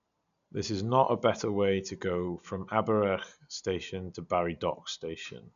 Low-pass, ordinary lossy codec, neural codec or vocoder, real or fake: 7.2 kHz; none; none; real